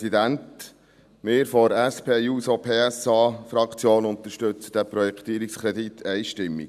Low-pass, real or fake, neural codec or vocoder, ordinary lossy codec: 14.4 kHz; real; none; none